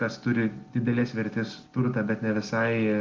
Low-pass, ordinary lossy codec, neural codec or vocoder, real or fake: 7.2 kHz; Opus, 24 kbps; none; real